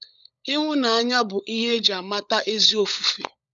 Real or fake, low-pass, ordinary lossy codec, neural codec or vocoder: fake; 7.2 kHz; none; codec, 16 kHz, 16 kbps, FunCodec, trained on LibriTTS, 50 frames a second